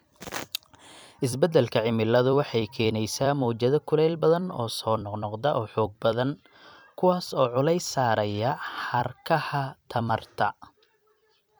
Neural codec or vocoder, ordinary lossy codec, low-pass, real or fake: vocoder, 44.1 kHz, 128 mel bands every 256 samples, BigVGAN v2; none; none; fake